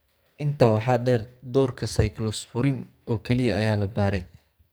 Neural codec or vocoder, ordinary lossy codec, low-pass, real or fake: codec, 44.1 kHz, 2.6 kbps, SNAC; none; none; fake